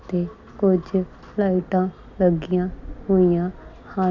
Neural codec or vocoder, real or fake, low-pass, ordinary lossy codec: none; real; 7.2 kHz; none